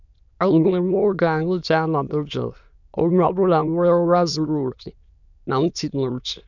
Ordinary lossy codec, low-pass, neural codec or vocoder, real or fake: none; 7.2 kHz; autoencoder, 22.05 kHz, a latent of 192 numbers a frame, VITS, trained on many speakers; fake